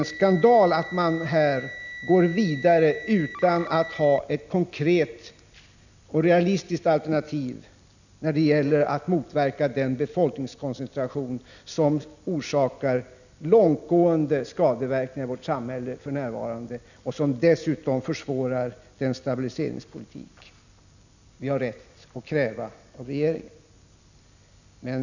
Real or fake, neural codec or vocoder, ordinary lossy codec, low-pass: real; none; none; 7.2 kHz